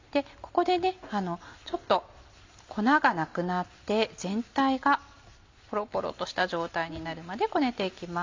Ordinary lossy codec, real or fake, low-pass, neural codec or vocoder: MP3, 64 kbps; real; 7.2 kHz; none